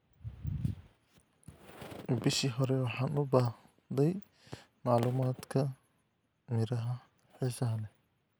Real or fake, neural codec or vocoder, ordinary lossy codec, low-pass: real; none; none; none